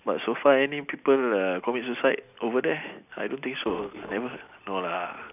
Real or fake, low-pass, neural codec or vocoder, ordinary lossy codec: real; 3.6 kHz; none; none